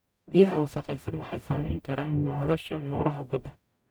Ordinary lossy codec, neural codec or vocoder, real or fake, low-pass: none; codec, 44.1 kHz, 0.9 kbps, DAC; fake; none